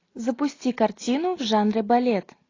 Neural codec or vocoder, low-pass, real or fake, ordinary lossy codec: none; 7.2 kHz; real; AAC, 32 kbps